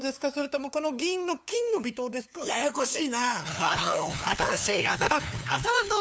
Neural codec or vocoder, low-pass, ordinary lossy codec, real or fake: codec, 16 kHz, 2 kbps, FunCodec, trained on LibriTTS, 25 frames a second; none; none; fake